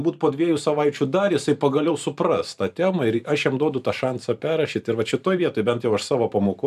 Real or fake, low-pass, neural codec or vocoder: fake; 14.4 kHz; vocoder, 44.1 kHz, 128 mel bands every 512 samples, BigVGAN v2